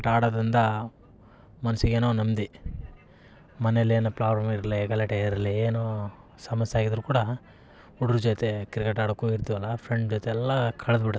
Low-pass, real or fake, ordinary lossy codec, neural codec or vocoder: none; real; none; none